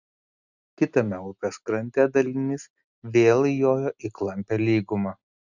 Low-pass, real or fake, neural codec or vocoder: 7.2 kHz; real; none